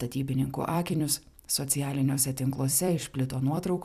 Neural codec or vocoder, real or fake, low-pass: vocoder, 44.1 kHz, 128 mel bands every 256 samples, BigVGAN v2; fake; 14.4 kHz